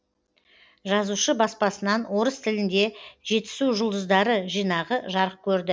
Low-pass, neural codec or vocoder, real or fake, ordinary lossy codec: none; none; real; none